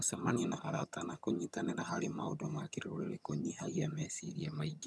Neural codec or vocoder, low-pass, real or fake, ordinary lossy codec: vocoder, 22.05 kHz, 80 mel bands, HiFi-GAN; none; fake; none